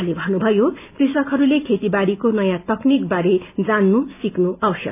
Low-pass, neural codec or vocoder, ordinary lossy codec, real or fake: 3.6 kHz; none; none; real